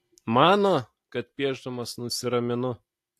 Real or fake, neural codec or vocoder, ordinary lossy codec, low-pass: real; none; AAC, 64 kbps; 14.4 kHz